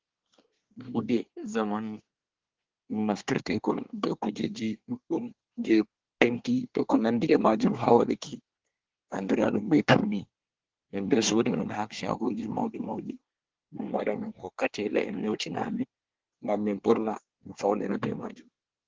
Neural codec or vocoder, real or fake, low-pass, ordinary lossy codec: codec, 24 kHz, 1 kbps, SNAC; fake; 7.2 kHz; Opus, 16 kbps